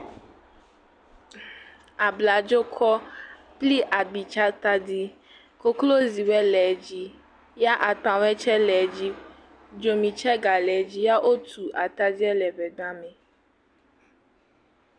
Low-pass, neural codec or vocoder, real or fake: 9.9 kHz; none; real